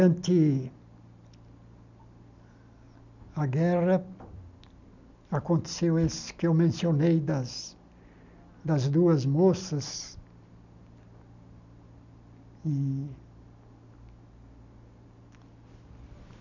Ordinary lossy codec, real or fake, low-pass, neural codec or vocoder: none; real; 7.2 kHz; none